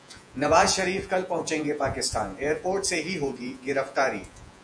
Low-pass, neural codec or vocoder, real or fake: 9.9 kHz; vocoder, 48 kHz, 128 mel bands, Vocos; fake